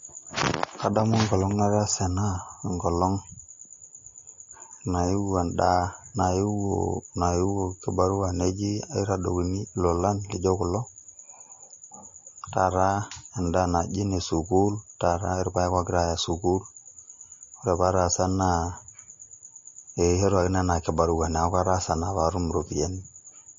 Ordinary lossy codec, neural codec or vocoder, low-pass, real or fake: MP3, 32 kbps; none; 7.2 kHz; real